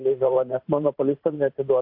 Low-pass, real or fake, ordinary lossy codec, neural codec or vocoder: 5.4 kHz; fake; AAC, 48 kbps; vocoder, 44.1 kHz, 128 mel bands every 512 samples, BigVGAN v2